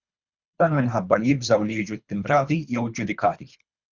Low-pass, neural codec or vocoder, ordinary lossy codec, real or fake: 7.2 kHz; codec, 24 kHz, 3 kbps, HILCodec; Opus, 64 kbps; fake